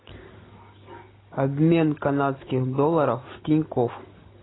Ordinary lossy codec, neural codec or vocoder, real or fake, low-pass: AAC, 16 kbps; none; real; 7.2 kHz